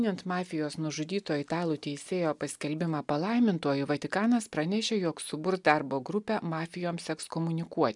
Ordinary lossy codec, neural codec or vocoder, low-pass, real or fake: MP3, 96 kbps; none; 10.8 kHz; real